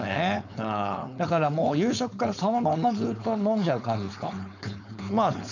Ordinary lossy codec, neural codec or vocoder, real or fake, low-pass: none; codec, 16 kHz, 4.8 kbps, FACodec; fake; 7.2 kHz